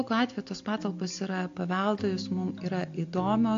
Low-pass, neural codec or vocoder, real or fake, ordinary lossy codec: 7.2 kHz; none; real; AAC, 48 kbps